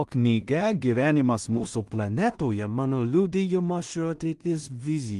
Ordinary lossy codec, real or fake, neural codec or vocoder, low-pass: Opus, 24 kbps; fake; codec, 16 kHz in and 24 kHz out, 0.4 kbps, LongCat-Audio-Codec, two codebook decoder; 10.8 kHz